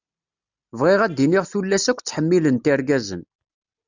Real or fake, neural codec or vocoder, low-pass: real; none; 7.2 kHz